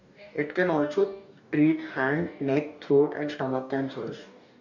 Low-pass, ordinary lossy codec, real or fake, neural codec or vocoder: 7.2 kHz; none; fake; codec, 44.1 kHz, 2.6 kbps, DAC